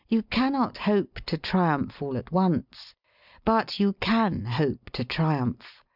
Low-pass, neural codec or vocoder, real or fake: 5.4 kHz; none; real